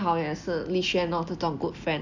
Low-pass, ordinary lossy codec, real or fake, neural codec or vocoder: 7.2 kHz; none; real; none